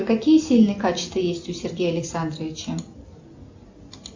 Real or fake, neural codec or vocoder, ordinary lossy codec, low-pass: real; none; AAC, 48 kbps; 7.2 kHz